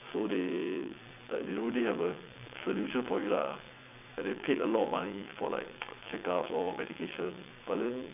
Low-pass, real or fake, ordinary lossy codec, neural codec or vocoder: 3.6 kHz; fake; none; vocoder, 22.05 kHz, 80 mel bands, WaveNeXt